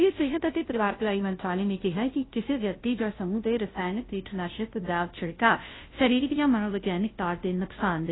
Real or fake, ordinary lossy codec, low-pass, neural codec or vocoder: fake; AAC, 16 kbps; 7.2 kHz; codec, 16 kHz, 0.5 kbps, FunCodec, trained on Chinese and English, 25 frames a second